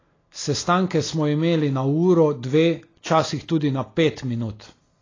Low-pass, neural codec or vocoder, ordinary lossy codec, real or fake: 7.2 kHz; none; AAC, 32 kbps; real